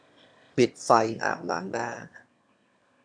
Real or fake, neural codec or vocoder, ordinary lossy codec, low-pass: fake; autoencoder, 22.05 kHz, a latent of 192 numbers a frame, VITS, trained on one speaker; none; 9.9 kHz